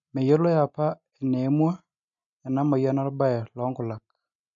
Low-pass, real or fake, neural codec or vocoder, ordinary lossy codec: 7.2 kHz; real; none; MP3, 48 kbps